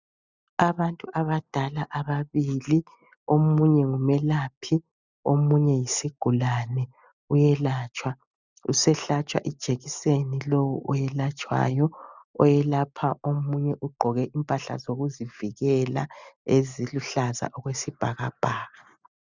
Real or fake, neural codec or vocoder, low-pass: real; none; 7.2 kHz